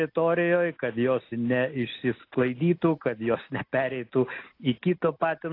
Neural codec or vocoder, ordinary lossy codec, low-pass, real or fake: none; AAC, 32 kbps; 5.4 kHz; real